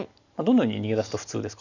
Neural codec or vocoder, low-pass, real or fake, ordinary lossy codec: none; 7.2 kHz; real; none